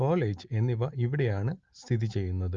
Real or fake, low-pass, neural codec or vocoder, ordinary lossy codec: real; 7.2 kHz; none; Opus, 32 kbps